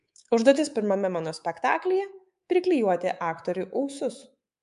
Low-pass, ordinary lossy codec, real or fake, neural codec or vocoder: 10.8 kHz; MP3, 64 kbps; fake; codec, 24 kHz, 3.1 kbps, DualCodec